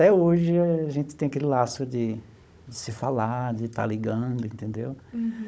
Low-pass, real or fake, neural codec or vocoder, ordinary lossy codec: none; fake; codec, 16 kHz, 16 kbps, FunCodec, trained on Chinese and English, 50 frames a second; none